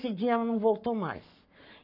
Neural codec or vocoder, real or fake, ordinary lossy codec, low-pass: codec, 44.1 kHz, 7.8 kbps, Pupu-Codec; fake; none; 5.4 kHz